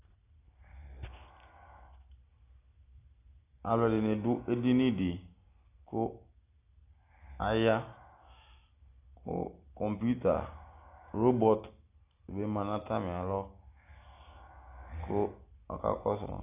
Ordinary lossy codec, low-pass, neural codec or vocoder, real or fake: AAC, 32 kbps; 3.6 kHz; none; real